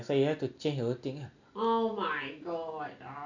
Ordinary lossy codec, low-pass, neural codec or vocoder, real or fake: none; 7.2 kHz; none; real